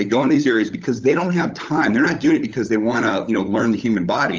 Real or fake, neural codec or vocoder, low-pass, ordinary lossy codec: fake; codec, 16 kHz, 16 kbps, FunCodec, trained on LibriTTS, 50 frames a second; 7.2 kHz; Opus, 32 kbps